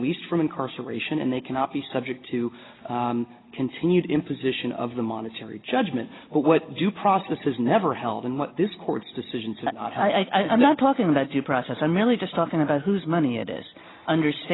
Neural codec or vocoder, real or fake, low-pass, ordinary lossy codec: none; real; 7.2 kHz; AAC, 16 kbps